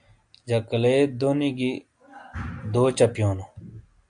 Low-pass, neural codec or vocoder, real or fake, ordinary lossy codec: 9.9 kHz; none; real; AAC, 64 kbps